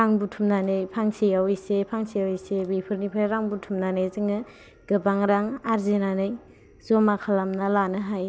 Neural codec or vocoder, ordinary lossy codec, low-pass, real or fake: none; none; none; real